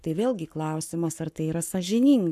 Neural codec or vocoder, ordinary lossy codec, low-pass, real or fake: codec, 44.1 kHz, 7.8 kbps, Pupu-Codec; MP3, 96 kbps; 14.4 kHz; fake